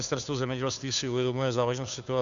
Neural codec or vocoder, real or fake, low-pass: codec, 16 kHz, 2 kbps, FunCodec, trained on Chinese and English, 25 frames a second; fake; 7.2 kHz